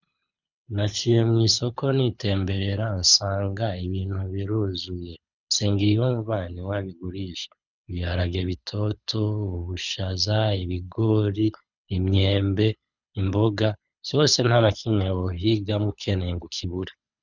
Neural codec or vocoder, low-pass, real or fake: codec, 24 kHz, 6 kbps, HILCodec; 7.2 kHz; fake